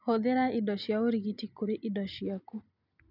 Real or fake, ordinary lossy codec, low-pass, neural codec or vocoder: real; none; 5.4 kHz; none